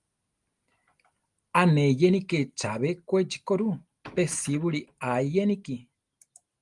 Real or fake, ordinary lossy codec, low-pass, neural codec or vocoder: real; Opus, 32 kbps; 10.8 kHz; none